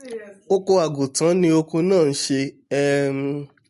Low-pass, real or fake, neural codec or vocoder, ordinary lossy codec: 14.4 kHz; real; none; MP3, 48 kbps